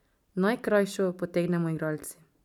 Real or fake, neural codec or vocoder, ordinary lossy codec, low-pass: real; none; none; 19.8 kHz